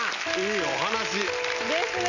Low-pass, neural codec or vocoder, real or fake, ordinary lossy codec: 7.2 kHz; none; real; none